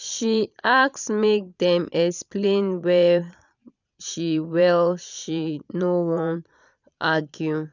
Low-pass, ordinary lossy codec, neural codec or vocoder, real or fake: 7.2 kHz; none; vocoder, 22.05 kHz, 80 mel bands, Vocos; fake